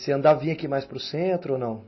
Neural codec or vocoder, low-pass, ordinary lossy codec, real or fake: none; 7.2 kHz; MP3, 24 kbps; real